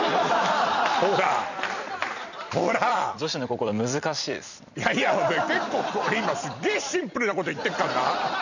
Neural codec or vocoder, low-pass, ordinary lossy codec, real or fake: vocoder, 44.1 kHz, 128 mel bands every 256 samples, BigVGAN v2; 7.2 kHz; none; fake